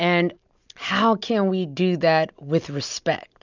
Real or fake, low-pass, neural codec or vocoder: real; 7.2 kHz; none